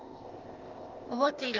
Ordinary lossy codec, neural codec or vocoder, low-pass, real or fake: Opus, 24 kbps; codec, 16 kHz, 0.8 kbps, ZipCodec; 7.2 kHz; fake